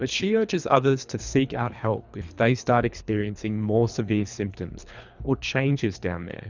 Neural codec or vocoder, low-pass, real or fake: codec, 24 kHz, 3 kbps, HILCodec; 7.2 kHz; fake